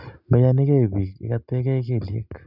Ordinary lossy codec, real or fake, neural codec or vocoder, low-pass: none; real; none; 5.4 kHz